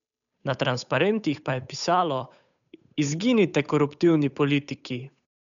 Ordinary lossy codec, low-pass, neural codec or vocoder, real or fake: none; 7.2 kHz; codec, 16 kHz, 8 kbps, FunCodec, trained on Chinese and English, 25 frames a second; fake